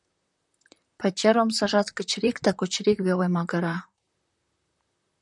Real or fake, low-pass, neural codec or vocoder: fake; 10.8 kHz; vocoder, 44.1 kHz, 128 mel bands, Pupu-Vocoder